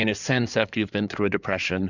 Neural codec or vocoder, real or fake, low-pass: codec, 16 kHz in and 24 kHz out, 2.2 kbps, FireRedTTS-2 codec; fake; 7.2 kHz